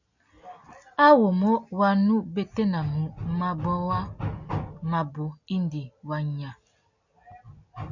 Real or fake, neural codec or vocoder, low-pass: real; none; 7.2 kHz